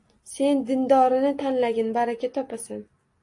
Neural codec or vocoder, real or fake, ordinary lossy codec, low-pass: none; real; AAC, 48 kbps; 10.8 kHz